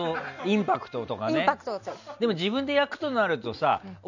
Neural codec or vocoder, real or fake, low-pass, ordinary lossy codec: none; real; 7.2 kHz; none